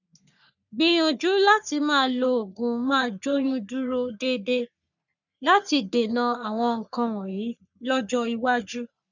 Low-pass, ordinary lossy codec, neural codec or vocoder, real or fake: 7.2 kHz; none; codec, 44.1 kHz, 3.4 kbps, Pupu-Codec; fake